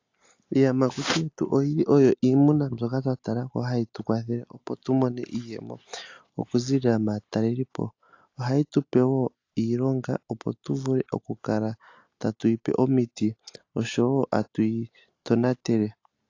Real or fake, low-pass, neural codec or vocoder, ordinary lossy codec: real; 7.2 kHz; none; AAC, 48 kbps